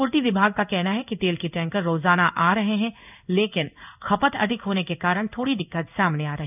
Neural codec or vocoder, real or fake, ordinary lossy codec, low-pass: codec, 16 kHz in and 24 kHz out, 1 kbps, XY-Tokenizer; fake; none; 3.6 kHz